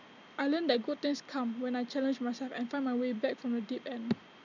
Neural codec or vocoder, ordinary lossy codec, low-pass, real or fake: none; none; 7.2 kHz; real